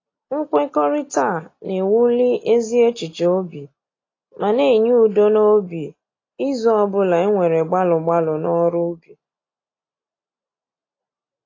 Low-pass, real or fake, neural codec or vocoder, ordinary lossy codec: 7.2 kHz; real; none; AAC, 32 kbps